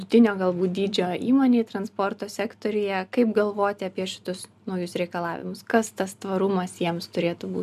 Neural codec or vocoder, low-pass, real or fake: none; 14.4 kHz; real